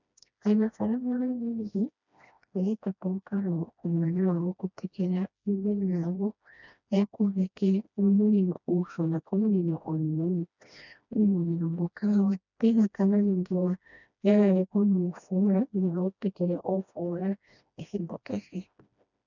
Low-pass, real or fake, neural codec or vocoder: 7.2 kHz; fake; codec, 16 kHz, 1 kbps, FreqCodec, smaller model